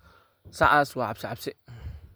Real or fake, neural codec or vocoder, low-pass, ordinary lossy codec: real; none; none; none